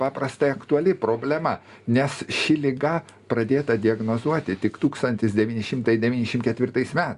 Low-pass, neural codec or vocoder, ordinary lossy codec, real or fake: 10.8 kHz; none; Opus, 64 kbps; real